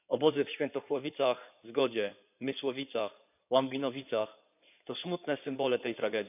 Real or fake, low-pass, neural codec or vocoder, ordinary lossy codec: fake; 3.6 kHz; codec, 16 kHz in and 24 kHz out, 2.2 kbps, FireRedTTS-2 codec; none